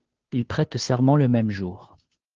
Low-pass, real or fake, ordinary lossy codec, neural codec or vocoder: 7.2 kHz; fake; Opus, 16 kbps; codec, 16 kHz, 2 kbps, FunCodec, trained on Chinese and English, 25 frames a second